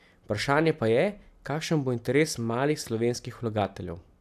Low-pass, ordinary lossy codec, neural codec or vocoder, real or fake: 14.4 kHz; AAC, 96 kbps; none; real